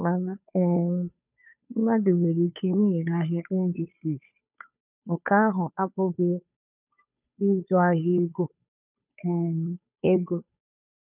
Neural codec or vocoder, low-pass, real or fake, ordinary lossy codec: codec, 16 kHz, 2 kbps, FunCodec, trained on Chinese and English, 25 frames a second; 3.6 kHz; fake; none